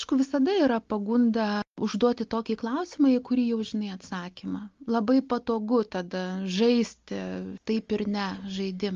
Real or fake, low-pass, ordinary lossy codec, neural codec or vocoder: real; 7.2 kHz; Opus, 32 kbps; none